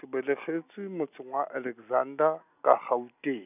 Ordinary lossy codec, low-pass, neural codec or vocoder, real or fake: none; 3.6 kHz; none; real